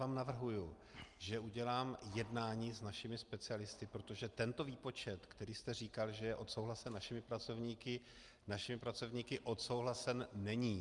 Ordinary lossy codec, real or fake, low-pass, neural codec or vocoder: Opus, 32 kbps; real; 10.8 kHz; none